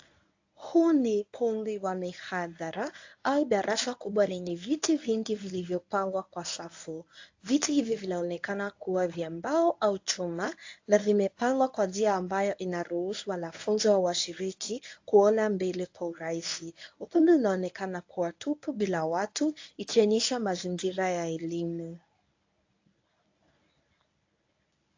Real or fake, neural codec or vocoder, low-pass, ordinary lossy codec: fake; codec, 24 kHz, 0.9 kbps, WavTokenizer, medium speech release version 1; 7.2 kHz; AAC, 48 kbps